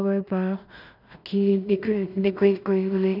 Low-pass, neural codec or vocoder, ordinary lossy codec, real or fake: 5.4 kHz; codec, 16 kHz in and 24 kHz out, 0.4 kbps, LongCat-Audio-Codec, two codebook decoder; none; fake